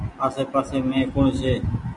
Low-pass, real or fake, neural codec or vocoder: 10.8 kHz; real; none